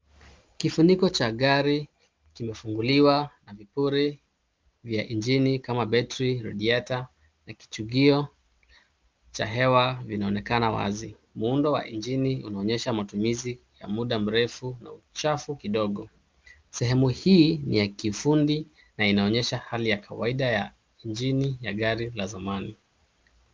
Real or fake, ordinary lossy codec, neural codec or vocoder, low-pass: real; Opus, 32 kbps; none; 7.2 kHz